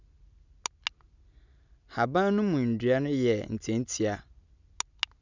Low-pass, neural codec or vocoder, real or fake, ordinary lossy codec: 7.2 kHz; none; real; none